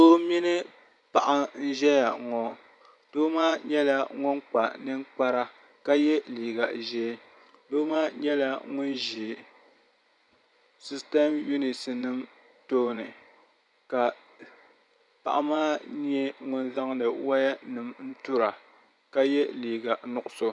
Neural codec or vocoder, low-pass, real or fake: vocoder, 24 kHz, 100 mel bands, Vocos; 10.8 kHz; fake